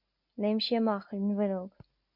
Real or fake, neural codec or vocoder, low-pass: real; none; 5.4 kHz